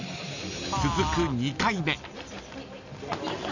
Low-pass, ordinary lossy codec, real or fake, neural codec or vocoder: 7.2 kHz; none; real; none